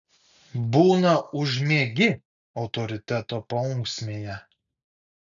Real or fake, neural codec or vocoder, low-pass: real; none; 7.2 kHz